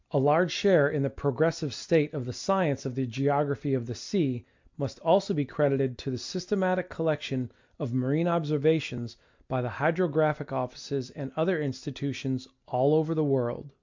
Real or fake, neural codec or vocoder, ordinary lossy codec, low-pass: real; none; MP3, 64 kbps; 7.2 kHz